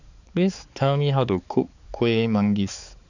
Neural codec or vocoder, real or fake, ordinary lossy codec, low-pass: codec, 16 kHz, 4 kbps, X-Codec, HuBERT features, trained on balanced general audio; fake; none; 7.2 kHz